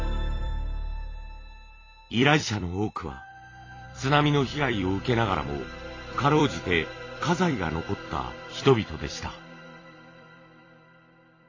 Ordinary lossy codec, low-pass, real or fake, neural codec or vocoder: AAC, 32 kbps; 7.2 kHz; fake; vocoder, 44.1 kHz, 128 mel bands every 256 samples, BigVGAN v2